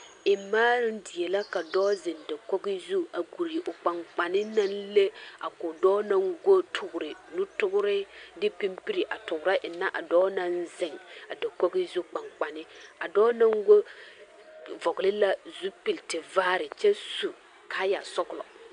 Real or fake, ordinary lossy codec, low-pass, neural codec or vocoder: real; AAC, 64 kbps; 9.9 kHz; none